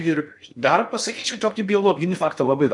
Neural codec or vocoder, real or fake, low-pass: codec, 16 kHz in and 24 kHz out, 0.6 kbps, FocalCodec, streaming, 2048 codes; fake; 10.8 kHz